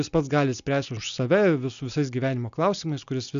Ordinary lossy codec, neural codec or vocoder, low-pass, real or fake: AAC, 96 kbps; none; 7.2 kHz; real